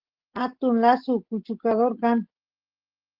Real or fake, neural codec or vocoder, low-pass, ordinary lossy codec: real; none; 5.4 kHz; Opus, 16 kbps